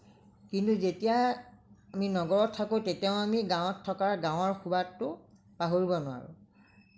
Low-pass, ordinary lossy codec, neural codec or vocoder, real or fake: none; none; none; real